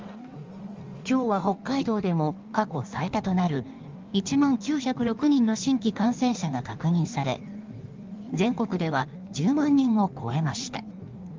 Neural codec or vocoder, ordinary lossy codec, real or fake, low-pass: codec, 16 kHz in and 24 kHz out, 1.1 kbps, FireRedTTS-2 codec; Opus, 32 kbps; fake; 7.2 kHz